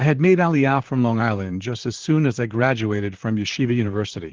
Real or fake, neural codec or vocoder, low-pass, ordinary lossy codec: fake; vocoder, 44.1 kHz, 128 mel bands, Pupu-Vocoder; 7.2 kHz; Opus, 16 kbps